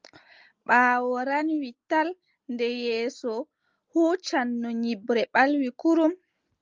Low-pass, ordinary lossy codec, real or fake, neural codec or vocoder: 7.2 kHz; Opus, 24 kbps; real; none